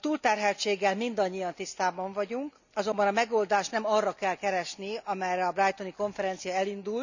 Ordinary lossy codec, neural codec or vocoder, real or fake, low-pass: none; none; real; 7.2 kHz